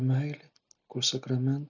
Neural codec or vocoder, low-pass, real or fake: none; 7.2 kHz; real